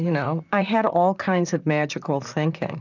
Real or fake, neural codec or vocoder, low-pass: fake; vocoder, 44.1 kHz, 128 mel bands, Pupu-Vocoder; 7.2 kHz